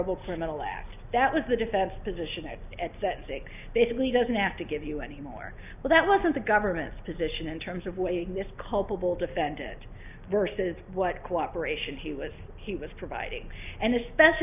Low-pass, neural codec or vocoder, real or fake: 3.6 kHz; none; real